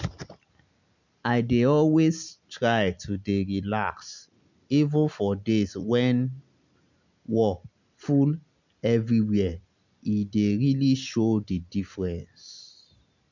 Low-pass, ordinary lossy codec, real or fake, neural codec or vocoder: 7.2 kHz; none; real; none